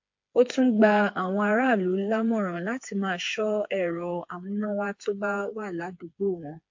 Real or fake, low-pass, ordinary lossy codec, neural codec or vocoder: fake; 7.2 kHz; MP3, 48 kbps; codec, 16 kHz, 4 kbps, FreqCodec, smaller model